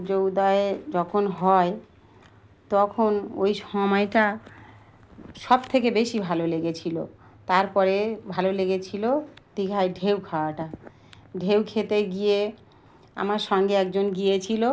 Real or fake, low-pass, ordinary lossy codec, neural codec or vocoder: real; none; none; none